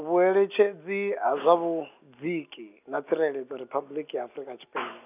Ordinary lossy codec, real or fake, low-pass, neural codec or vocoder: none; real; 3.6 kHz; none